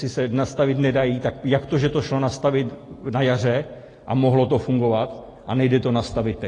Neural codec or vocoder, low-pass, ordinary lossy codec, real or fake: none; 10.8 kHz; AAC, 32 kbps; real